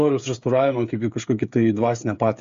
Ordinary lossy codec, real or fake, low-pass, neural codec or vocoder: MP3, 48 kbps; fake; 7.2 kHz; codec, 16 kHz, 8 kbps, FreqCodec, smaller model